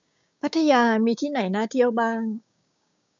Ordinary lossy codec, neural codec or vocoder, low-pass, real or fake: MP3, 96 kbps; codec, 16 kHz, 6 kbps, DAC; 7.2 kHz; fake